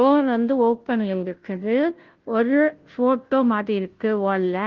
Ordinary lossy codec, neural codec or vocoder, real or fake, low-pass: Opus, 16 kbps; codec, 16 kHz, 0.5 kbps, FunCodec, trained on Chinese and English, 25 frames a second; fake; 7.2 kHz